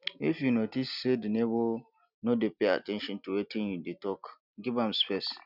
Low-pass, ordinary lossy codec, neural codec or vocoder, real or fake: 5.4 kHz; none; none; real